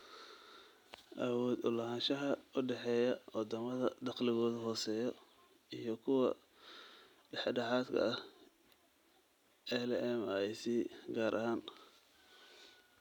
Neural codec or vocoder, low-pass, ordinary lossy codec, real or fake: none; 19.8 kHz; none; real